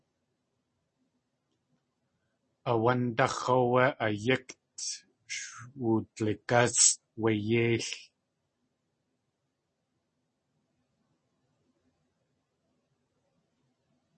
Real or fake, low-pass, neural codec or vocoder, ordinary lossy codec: real; 10.8 kHz; none; MP3, 32 kbps